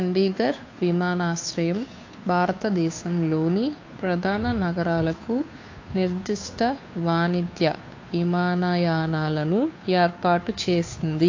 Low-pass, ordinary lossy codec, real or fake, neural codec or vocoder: 7.2 kHz; AAC, 48 kbps; fake; codec, 16 kHz, 2 kbps, FunCodec, trained on Chinese and English, 25 frames a second